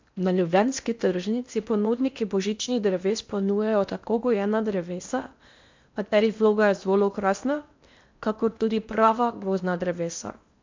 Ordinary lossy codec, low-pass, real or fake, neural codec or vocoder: MP3, 64 kbps; 7.2 kHz; fake; codec, 16 kHz in and 24 kHz out, 0.8 kbps, FocalCodec, streaming, 65536 codes